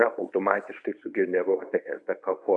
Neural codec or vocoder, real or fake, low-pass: codec, 24 kHz, 0.9 kbps, WavTokenizer, medium speech release version 1; fake; 10.8 kHz